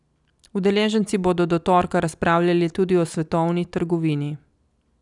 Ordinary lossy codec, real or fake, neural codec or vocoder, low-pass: none; real; none; 10.8 kHz